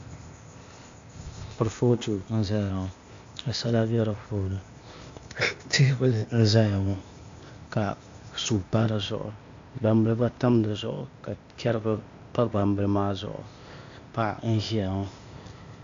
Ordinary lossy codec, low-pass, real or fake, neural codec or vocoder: AAC, 64 kbps; 7.2 kHz; fake; codec, 16 kHz, 0.8 kbps, ZipCodec